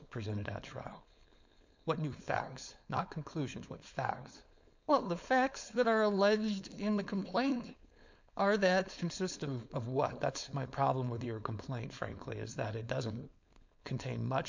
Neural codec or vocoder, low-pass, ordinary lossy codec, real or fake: codec, 16 kHz, 4.8 kbps, FACodec; 7.2 kHz; MP3, 64 kbps; fake